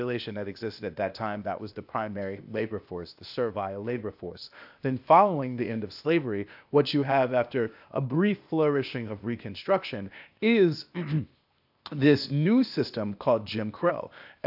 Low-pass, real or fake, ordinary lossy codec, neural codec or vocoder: 5.4 kHz; fake; AAC, 48 kbps; codec, 16 kHz, 0.8 kbps, ZipCodec